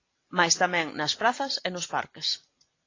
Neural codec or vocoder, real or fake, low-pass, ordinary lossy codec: none; real; 7.2 kHz; AAC, 32 kbps